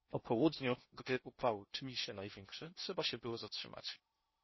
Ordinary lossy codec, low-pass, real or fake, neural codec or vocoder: MP3, 24 kbps; 7.2 kHz; fake; codec, 16 kHz in and 24 kHz out, 0.6 kbps, FocalCodec, streaming, 4096 codes